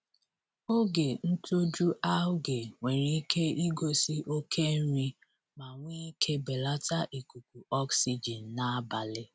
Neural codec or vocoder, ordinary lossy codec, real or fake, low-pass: none; none; real; none